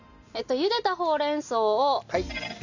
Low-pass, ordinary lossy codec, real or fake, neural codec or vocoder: 7.2 kHz; none; real; none